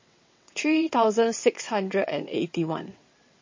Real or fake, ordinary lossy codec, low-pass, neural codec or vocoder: fake; MP3, 32 kbps; 7.2 kHz; vocoder, 22.05 kHz, 80 mel bands, WaveNeXt